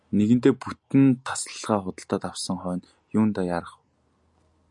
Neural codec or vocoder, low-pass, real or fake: none; 10.8 kHz; real